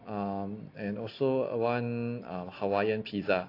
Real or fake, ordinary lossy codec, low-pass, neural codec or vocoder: real; AAC, 32 kbps; 5.4 kHz; none